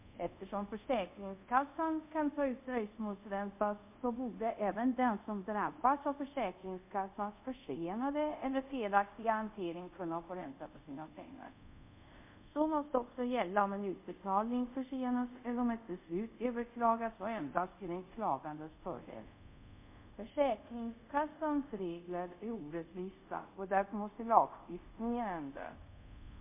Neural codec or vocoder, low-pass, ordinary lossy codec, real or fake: codec, 24 kHz, 0.5 kbps, DualCodec; 3.6 kHz; MP3, 32 kbps; fake